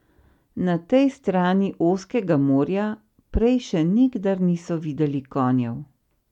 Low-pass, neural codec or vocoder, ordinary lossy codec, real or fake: 19.8 kHz; none; MP3, 96 kbps; real